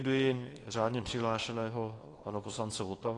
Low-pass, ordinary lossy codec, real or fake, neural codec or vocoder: 10.8 kHz; AAC, 32 kbps; fake; codec, 24 kHz, 0.9 kbps, WavTokenizer, small release